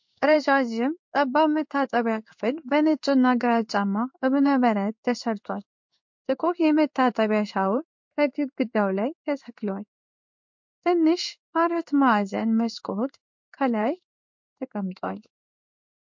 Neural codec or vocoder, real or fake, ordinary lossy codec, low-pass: codec, 16 kHz in and 24 kHz out, 1 kbps, XY-Tokenizer; fake; MP3, 48 kbps; 7.2 kHz